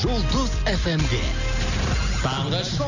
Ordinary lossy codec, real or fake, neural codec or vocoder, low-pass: none; real; none; 7.2 kHz